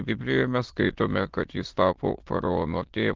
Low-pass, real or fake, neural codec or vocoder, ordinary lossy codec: 7.2 kHz; fake; autoencoder, 22.05 kHz, a latent of 192 numbers a frame, VITS, trained on many speakers; Opus, 16 kbps